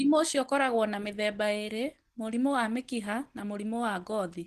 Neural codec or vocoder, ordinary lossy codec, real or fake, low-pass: none; Opus, 16 kbps; real; 14.4 kHz